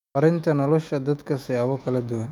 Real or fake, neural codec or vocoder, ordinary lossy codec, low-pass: fake; autoencoder, 48 kHz, 128 numbers a frame, DAC-VAE, trained on Japanese speech; none; 19.8 kHz